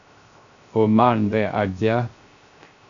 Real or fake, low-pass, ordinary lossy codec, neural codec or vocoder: fake; 7.2 kHz; AAC, 64 kbps; codec, 16 kHz, 0.3 kbps, FocalCodec